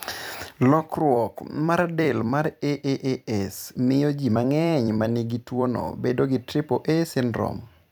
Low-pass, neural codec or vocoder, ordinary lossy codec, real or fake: none; vocoder, 44.1 kHz, 128 mel bands every 256 samples, BigVGAN v2; none; fake